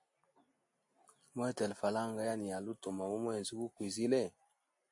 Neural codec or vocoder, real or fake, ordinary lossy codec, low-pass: none; real; AAC, 64 kbps; 10.8 kHz